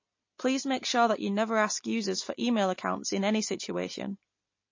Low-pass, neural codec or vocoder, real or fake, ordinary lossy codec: 7.2 kHz; none; real; MP3, 32 kbps